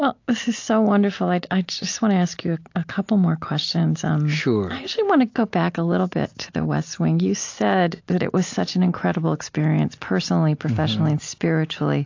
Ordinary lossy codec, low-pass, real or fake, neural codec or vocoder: AAC, 48 kbps; 7.2 kHz; real; none